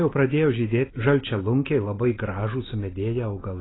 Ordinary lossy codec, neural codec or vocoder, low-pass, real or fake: AAC, 16 kbps; none; 7.2 kHz; real